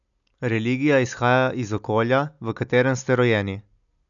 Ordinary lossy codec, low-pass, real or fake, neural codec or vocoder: none; 7.2 kHz; real; none